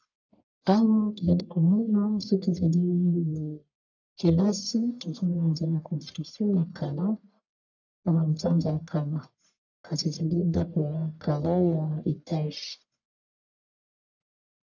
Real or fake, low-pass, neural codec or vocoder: fake; 7.2 kHz; codec, 44.1 kHz, 1.7 kbps, Pupu-Codec